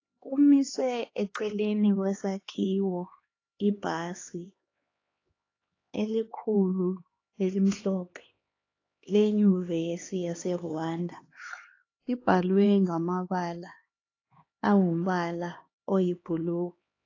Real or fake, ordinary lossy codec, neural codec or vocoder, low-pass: fake; AAC, 32 kbps; codec, 16 kHz, 2 kbps, X-Codec, HuBERT features, trained on LibriSpeech; 7.2 kHz